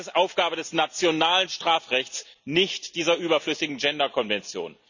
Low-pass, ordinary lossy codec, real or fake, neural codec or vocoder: 7.2 kHz; none; real; none